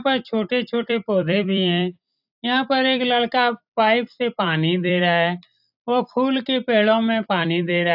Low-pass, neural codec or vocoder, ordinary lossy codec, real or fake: 5.4 kHz; vocoder, 44.1 kHz, 128 mel bands every 256 samples, BigVGAN v2; AAC, 48 kbps; fake